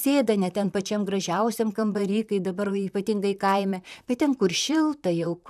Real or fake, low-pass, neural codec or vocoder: fake; 14.4 kHz; vocoder, 44.1 kHz, 128 mel bands, Pupu-Vocoder